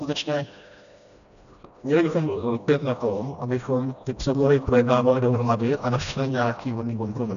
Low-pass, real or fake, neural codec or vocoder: 7.2 kHz; fake; codec, 16 kHz, 1 kbps, FreqCodec, smaller model